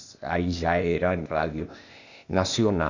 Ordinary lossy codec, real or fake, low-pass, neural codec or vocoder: none; fake; 7.2 kHz; codec, 16 kHz, 0.8 kbps, ZipCodec